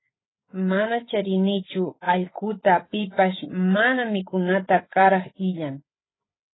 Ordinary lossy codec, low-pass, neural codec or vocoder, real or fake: AAC, 16 kbps; 7.2 kHz; codec, 16 kHz, 8 kbps, FreqCodec, larger model; fake